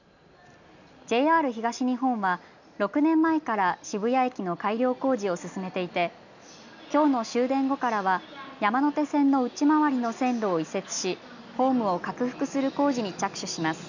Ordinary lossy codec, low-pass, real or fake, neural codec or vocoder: none; 7.2 kHz; real; none